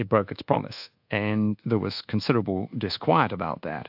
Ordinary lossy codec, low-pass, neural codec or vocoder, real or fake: AAC, 48 kbps; 5.4 kHz; codec, 24 kHz, 1.2 kbps, DualCodec; fake